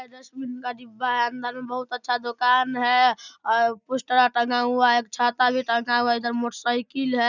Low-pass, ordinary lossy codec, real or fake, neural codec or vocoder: 7.2 kHz; none; real; none